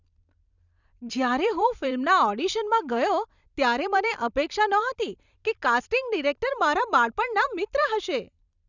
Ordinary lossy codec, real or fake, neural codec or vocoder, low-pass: none; fake; vocoder, 44.1 kHz, 128 mel bands every 256 samples, BigVGAN v2; 7.2 kHz